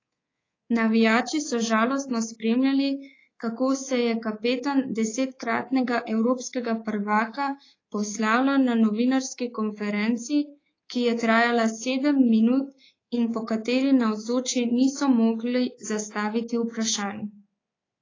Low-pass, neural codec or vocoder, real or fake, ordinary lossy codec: 7.2 kHz; codec, 24 kHz, 3.1 kbps, DualCodec; fake; AAC, 32 kbps